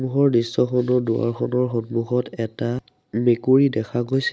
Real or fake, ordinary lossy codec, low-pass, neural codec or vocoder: real; none; none; none